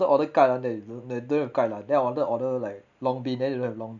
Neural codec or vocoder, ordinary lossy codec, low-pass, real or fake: none; none; 7.2 kHz; real